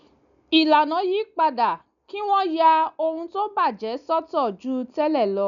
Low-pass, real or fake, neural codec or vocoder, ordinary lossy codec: 7.2 kHz; real; none; none